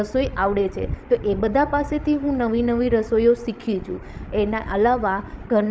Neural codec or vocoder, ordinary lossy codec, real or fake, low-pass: codec, 16 kHz, 16 kbps, FreqCodec, larger model; none; fake; none